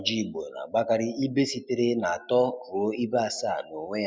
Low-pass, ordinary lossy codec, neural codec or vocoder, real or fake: none; none; none; real